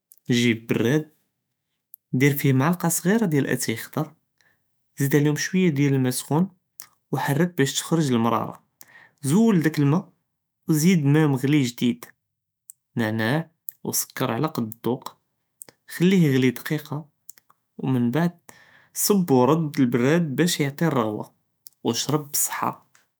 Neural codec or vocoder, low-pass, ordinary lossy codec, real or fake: autoencoder, 48 kHz, 128 numbers a frame, DAC-VAE, trained on Japanese speech; none; none; fake